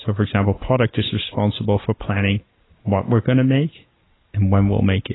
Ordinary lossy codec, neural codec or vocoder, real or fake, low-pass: AAC, 16 kbps; none; real; 7.2 kHz